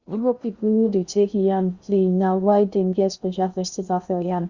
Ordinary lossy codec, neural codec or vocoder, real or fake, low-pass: Opus, 64 kbps; codec, 16 kHz in and 24 kHz out, 0.6 kbps, FocalCodec, streaming, 2048 codes; fake; 7.2 kHz